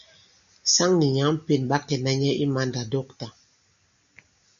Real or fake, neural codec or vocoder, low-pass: real; none; 7.2 kHz